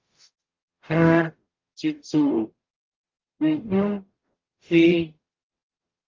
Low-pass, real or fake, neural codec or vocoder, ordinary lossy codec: 7.2 kHz; fake; codec, 44.1 kHz, 0.9 kbps, DAC; Opus, 24 kbps